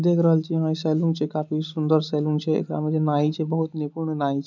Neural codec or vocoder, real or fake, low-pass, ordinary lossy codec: none; real; 7.2 kHz; none